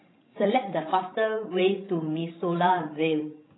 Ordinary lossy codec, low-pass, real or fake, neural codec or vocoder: AAC, 16 kbps; 7.2 kHz; fake; codec, 16 kHz, 16 kbps, FreqCodec, larger model